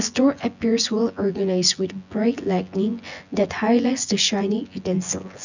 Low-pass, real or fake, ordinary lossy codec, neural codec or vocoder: 7.2 kHz; fake; none; vocoder, 24 kHz, 100 mel bands, Vocos